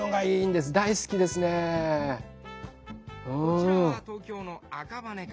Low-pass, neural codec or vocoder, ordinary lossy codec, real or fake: none; none; none; real